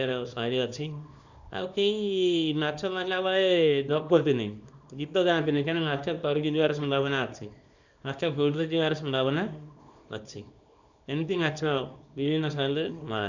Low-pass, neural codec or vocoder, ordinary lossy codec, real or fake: 7.2 kHz; codec, 24 kHz, 0.9 kbps, WavTokenizer, small release; none; fake